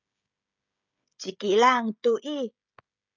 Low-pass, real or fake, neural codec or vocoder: 7.2 kHz; fake; codec, 16 kHz, 16 kbps, FreqCodec, smaller model